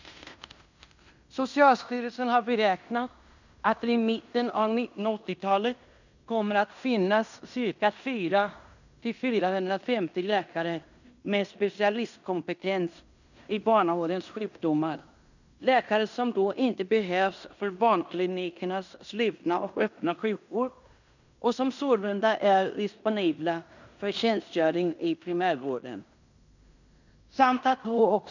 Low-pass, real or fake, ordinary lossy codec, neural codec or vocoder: 7.2 kHz; fake; none; codec, 16 kHz in and 24 kHz out, 0.9 kbps, LongCat-Audio-Codec, fine tuned four codebook decoder